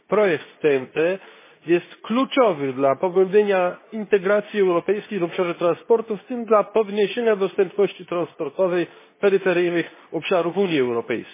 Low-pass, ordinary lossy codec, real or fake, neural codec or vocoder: 3.6 kHz; MP3, 16 kbps; fake; codec, 24 kHz, 0.9 kbps, WavTokenizer, medium speech release version 2